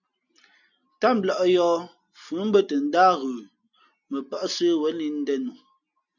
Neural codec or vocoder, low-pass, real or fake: none; 7.2 kHz; real